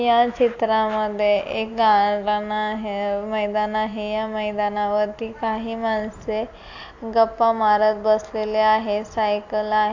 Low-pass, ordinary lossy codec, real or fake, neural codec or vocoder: 7.2 kHz; AAC, 48 kbps; real; none